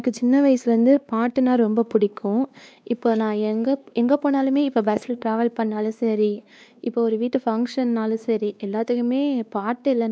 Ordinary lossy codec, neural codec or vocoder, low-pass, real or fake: none; codec, 16 kHz, 2 kbps, X-Codec, WavLM features, trained on Multilingual LibriSpeech; none; fake